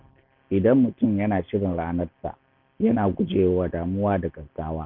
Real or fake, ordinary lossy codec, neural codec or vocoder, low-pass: real; none; none; 5.4 kHz